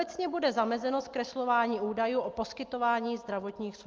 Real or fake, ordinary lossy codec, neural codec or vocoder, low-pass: real; Opus, 32 kbps; none; 7.2 kHz